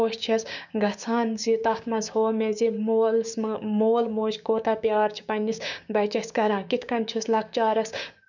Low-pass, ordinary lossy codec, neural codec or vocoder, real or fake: 7.2 kHz; none; codec, 16 kHz, 6 kbps, DAC; fake